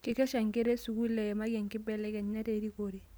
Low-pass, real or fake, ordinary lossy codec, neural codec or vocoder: none; real; none; none